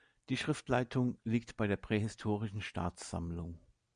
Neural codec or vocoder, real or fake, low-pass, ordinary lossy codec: none; real; 9.9 kHz; MP3, 96 kbps